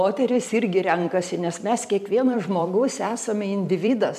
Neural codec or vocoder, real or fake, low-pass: vocoder, 44.1 kHz, 128 mel bands every 256 samples, BigVGAN v2; fake; 14.4 kHz